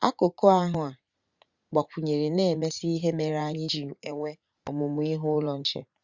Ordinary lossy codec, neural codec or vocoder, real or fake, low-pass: Opus, 64 kbps; vocoder, 44.1 kHz, 80 mel bands, Vocos; fake; 7.2 kHz